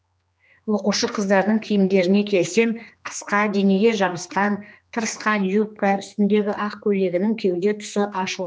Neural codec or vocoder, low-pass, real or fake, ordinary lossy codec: codec, 16 kHz, 2 kbps, X-Codec, HuBERT features, trained on general audio; none; fake; none